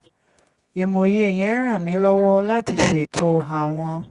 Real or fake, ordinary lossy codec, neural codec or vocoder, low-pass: fake; Opus, 64 kbps; codec, 24 kHz, 0.9 kbps, WavTokenizer, medium music audio release; 10.8 kHz